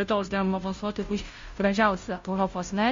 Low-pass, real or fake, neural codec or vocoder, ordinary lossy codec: 7.2 kHz; fake; codec, 16 kHz, 0.5 kbps, FunCodec, trained on Chinese and English, 25 frames a second; MP3, 48 kbps